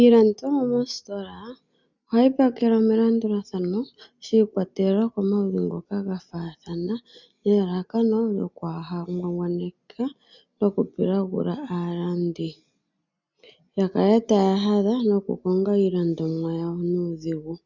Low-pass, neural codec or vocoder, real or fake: 7.2 kHz; none; real